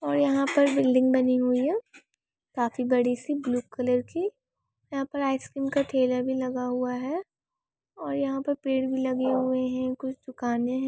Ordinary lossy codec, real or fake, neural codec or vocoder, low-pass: none; real; none; none